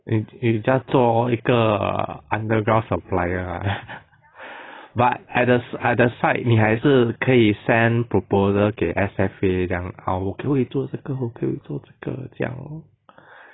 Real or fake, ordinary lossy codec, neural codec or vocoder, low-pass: real; AAC, 16 kbps; none; 7.2 kHz